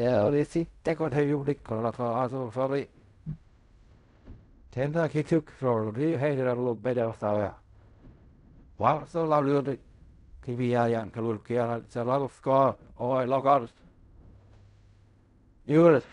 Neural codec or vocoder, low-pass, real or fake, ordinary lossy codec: codec, 16 kHz in and 24 kHz out, 0.4 kbps, LongCat-Audio-Codec, fine tuned four codebook decoder; 10.8 kHz; fake; none